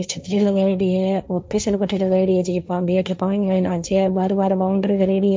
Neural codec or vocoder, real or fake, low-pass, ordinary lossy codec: codec, 16 kHz, 1.1 kbps, Voila-Tokenizer; fake; none; none